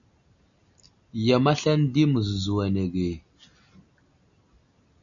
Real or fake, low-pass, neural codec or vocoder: real; 7.2 kHz; none